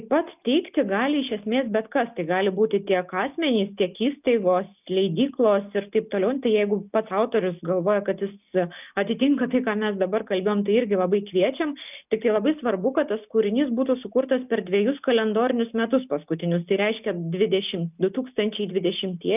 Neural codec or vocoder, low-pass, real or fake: none; 3.6 kHz; real